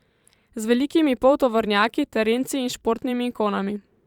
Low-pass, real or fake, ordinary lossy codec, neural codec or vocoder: 19.8 kHz; fake; Opus, 64 kbps; vocoder, 44.1 kHz, 128 mel bands every 512 samples, BigVGAN v2